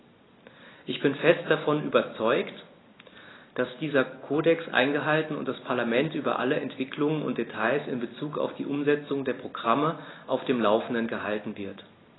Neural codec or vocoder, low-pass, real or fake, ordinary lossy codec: none; 7.2 kHz; real; AAC, 16 kbps